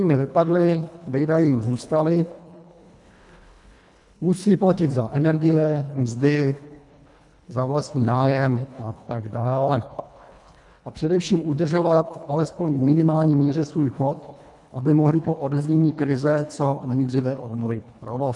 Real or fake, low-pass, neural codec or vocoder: fake; 10.8 kHz; codec, 24 kHz, 1.5 kbps, HILCodec